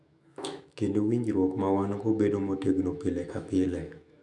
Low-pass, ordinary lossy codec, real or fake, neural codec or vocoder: 10.8 kHz; none; fake; autoencoder, 48 kHz, 128 numbers a frame, DAC-VAE, trained on Japanese speech